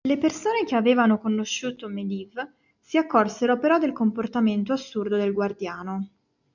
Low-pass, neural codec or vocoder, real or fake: 7.2 kHz; none; real